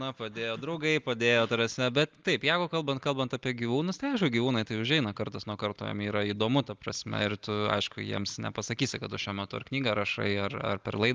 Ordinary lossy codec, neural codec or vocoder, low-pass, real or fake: Opus, 32 kbps; none; 7.2 kHz; real